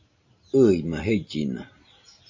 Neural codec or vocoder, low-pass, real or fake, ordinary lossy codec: none; 7.2 kHz; real; MP3, 32 kbps